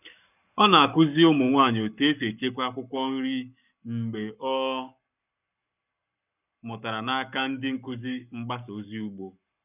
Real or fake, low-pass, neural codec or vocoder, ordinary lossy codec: fake; 3.6 kHz; codec, 44.1 kHz, 7.8 kbps, Pupu-Codec; none